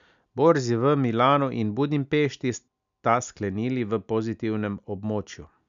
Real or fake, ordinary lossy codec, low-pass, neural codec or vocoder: real; none; 7.2 kHz; none